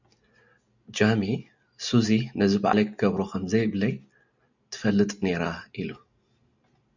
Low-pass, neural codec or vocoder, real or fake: 7.2 kHz; none; real